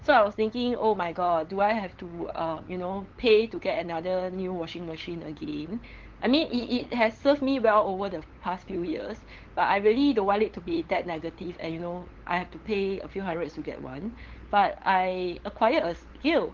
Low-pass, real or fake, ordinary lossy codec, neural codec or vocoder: 7.2 kHz; fake; Opus, 16 kbps; codec, 16 kHz, 8 kbps, FunCodec, trained on LibriTTS, 25 frames a second